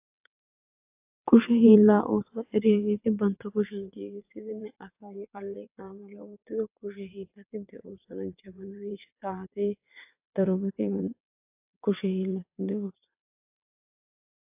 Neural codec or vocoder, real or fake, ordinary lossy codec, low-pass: vocoder, 22.05 kHz, 80 mel bands, WaveNeXt; fake; AAC, 32 kbps; 3.6 kHz